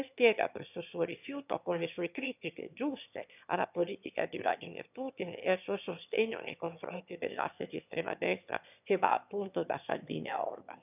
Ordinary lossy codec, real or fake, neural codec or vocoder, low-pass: none; fake; autoencoder, 22.05 kHz, a latent of 192 numbers a frame, VITS, trained on one speaker; 3.6 kHz